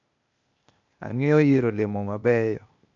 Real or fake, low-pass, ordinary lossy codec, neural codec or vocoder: fake; 7.2 kHz; none; codec, 16 kHz, 0.8 kbps, ZipCodec